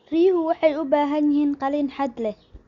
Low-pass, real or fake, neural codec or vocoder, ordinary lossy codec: 7.2 kHz; real; none; Opus, 64 kbps